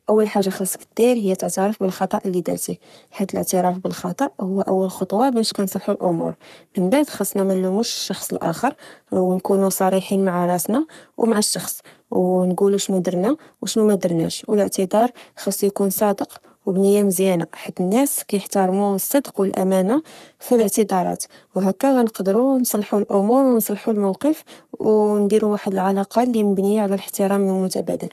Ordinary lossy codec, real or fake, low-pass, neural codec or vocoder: none; fake; 14.4 kHz; codec, 44.1 kHz, 3.4 kbps, Pupu-Codec